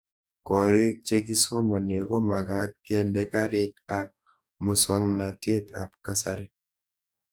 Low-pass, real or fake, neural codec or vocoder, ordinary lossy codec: none; fake; codec, 44.1 kHz, 2.6 kbps, DAC; none